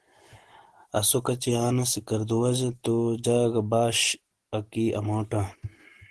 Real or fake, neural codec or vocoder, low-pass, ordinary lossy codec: real; none; 10.8 kHz; Opus, 16 kbps